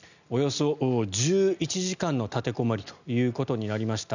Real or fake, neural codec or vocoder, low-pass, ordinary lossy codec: real; none; 7.2 kHz; none